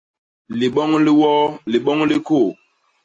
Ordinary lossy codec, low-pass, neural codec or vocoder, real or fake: AAC, 48 kbps; 9.9 kHz; none; real